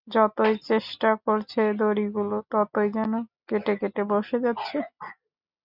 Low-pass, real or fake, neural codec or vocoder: 5.4 kHz; real; none